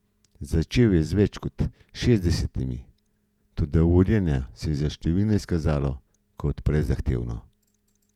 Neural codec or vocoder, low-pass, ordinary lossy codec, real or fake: vocoder, 48 kHz, 128 mel bands, Vocos; 19.8 kHz; none; fake